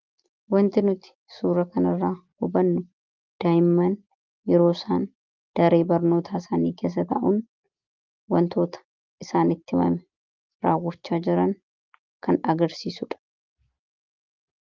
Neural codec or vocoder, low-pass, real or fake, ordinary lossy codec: none; 7.2 kHz; real; Opus, 32 kbps